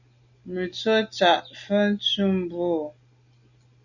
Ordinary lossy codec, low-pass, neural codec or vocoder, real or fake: Opus, 64 kbps; 7.2 kHz; none; real